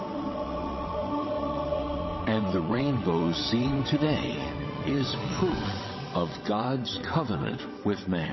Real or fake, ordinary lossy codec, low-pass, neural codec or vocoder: fake; MP3, 24 kbps; 7.2 kHz; vocoder, 22.05 kHz, 80 mel bands, WaveNeXt